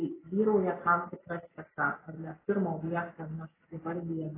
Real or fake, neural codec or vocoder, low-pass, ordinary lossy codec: real; none; 3.6 kHz; AAC, 16 kbps